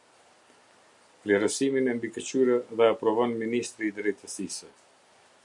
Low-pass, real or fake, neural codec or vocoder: 10.8 kHz; real; none